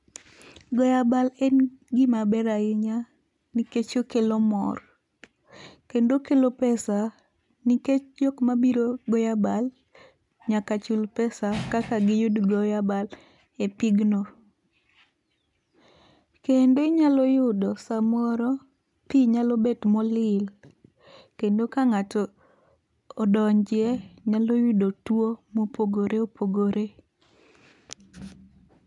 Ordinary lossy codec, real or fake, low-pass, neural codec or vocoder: none; real; 10.8 kHz; none